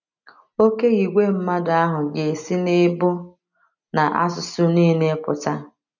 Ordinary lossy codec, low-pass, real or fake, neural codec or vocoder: none; 7.2 kHz; real; none